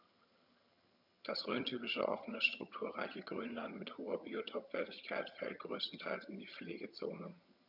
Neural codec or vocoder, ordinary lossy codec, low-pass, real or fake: vocoder, 22.05 kHz, 80 mel bands, HiFi-GAN; none; 5.4 kHz; fake